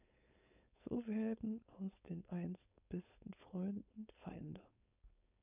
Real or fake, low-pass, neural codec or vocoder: fake; 3.6 kHz; codec, 16 kHz, 4.8 kbps, FACodec